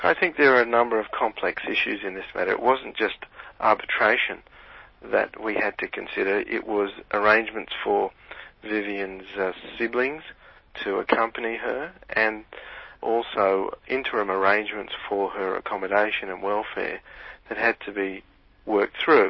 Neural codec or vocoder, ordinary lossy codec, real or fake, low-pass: none; MP3, 24 kbps; real; 7.2 kHz